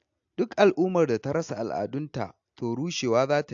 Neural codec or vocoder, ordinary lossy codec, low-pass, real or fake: none; AAC, 64 kbps; 7.2 kHz; real